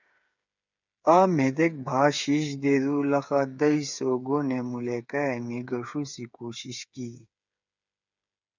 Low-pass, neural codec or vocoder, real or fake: 7.2 kHz; codec, 16 kHz, 8 kbps, FreqCodec, smaller model; fake